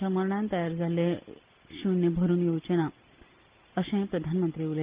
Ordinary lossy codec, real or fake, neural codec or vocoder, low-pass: Opus, 32 kbps; real; none; 3.6 kHz